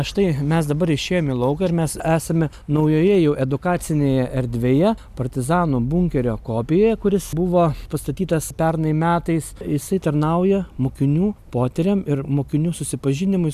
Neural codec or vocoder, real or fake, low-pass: none; real; 14.4 kHz